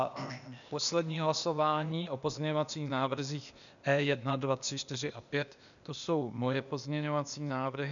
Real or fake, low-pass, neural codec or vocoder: fake; 7.2 kHz; codec, 16 kHz, 0.8 kbps, ZipCodec